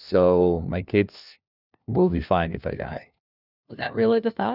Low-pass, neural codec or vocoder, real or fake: 5.4 kHz; codec, 16 kHz, 1 kbps, FunCodec, trained on LibriTTS, 50 frames a second; fake